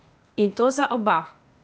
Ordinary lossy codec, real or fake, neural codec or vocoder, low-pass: none; fake; codec, 16 kHz, 0.8 kbps, ZipCodec; none